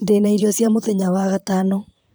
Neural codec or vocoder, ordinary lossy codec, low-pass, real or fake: vocoder, 44.1 kHz, 128 mel bands, Pupu-Vocoder; none; none; fake